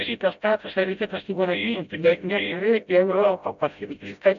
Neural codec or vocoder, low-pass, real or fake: codec, 16 kHz, 0.5 kbps, FreqCodec, smaller model; 7.2 kHz; fake